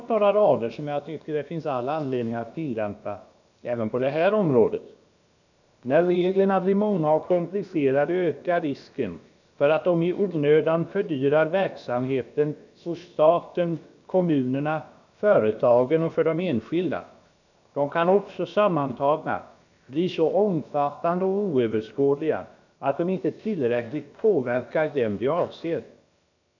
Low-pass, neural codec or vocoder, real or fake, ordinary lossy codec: 7.2 kHz; codec, 16 kHz, about 1 kbps, DyCAST, with the encoder's durations; fake; none